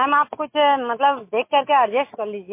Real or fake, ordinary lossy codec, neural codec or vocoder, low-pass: real; MP3, 24 kbps; none; 3.6 kHz